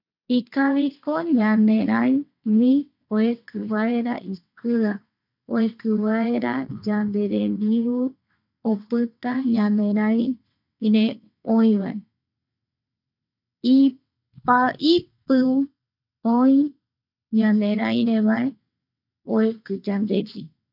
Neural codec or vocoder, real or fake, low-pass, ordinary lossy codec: vocoder, 22.05 kHz, 80 mel bands, WaveNeXt; fake; 5.4 kHz; none